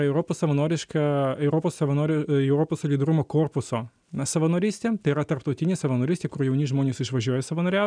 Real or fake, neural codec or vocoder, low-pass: real; none; 9.9 kHz